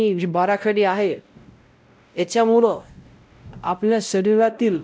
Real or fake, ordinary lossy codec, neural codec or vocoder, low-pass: fake; none; codec, 16 kHz, 0.5 kbps, X-Codec, WavLM features, trained on Multilingual LibriSpeech; none